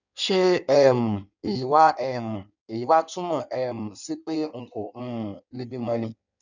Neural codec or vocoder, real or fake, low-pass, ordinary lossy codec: codec, 16 kHz in and 24 kHz out, 1.1 kbps, FireRedTTS-2 codec; fake; 7.2 kHz; none